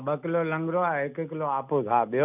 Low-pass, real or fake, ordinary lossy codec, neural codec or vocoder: 3.6 kHz; real; none; none